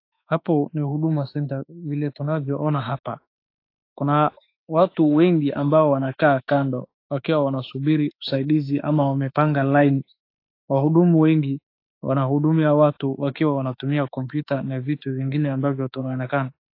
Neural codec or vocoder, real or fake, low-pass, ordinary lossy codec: autoencoder, 48 kHz, 32 numbers a frame, DAC-VAE, trained on Japanese speech; fake; 5.4 kHz; AAC, 32 kbps